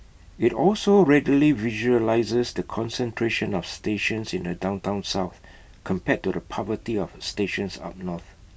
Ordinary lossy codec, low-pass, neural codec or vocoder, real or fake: none; none; none; real